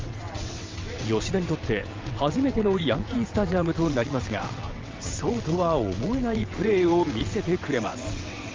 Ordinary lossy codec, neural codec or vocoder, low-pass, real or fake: Opus, 32 kbps; vocoder, 22.05 kHz, 80 mel bands, WaveNeXt; 7.2 kHz; fake